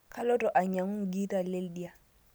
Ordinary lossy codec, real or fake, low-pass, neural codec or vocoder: none; real; none; none